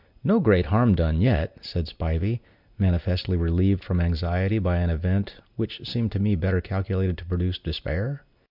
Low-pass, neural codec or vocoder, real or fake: 5.4 kHz; none; real